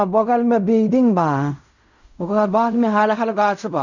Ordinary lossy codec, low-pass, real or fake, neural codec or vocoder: none; 7.2 kHz; fake; codec, 16 kHz in and 24 kHz out, 0.4 kbps, LongCat-Audio-Codec, fine tuned four codebook decoder